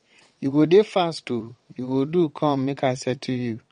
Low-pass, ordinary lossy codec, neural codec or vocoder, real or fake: 9.9 kHz; MP3, 48 kbps; vocoder, 22.05 kHz, 80 mel bands, WaveNeXt; fake